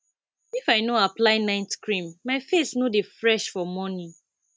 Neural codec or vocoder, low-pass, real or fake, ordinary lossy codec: none; none; real; none